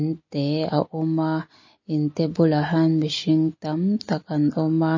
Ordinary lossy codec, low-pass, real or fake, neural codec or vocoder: MP3, 32 kbps; 7.2 kHz; real; none